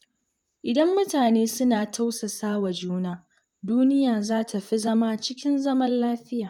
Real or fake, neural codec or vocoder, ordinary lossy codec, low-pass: fake; vocoder, 44.1 kHz, 128 mel bands, Pupu-Vocoder; none; 19.8 kHz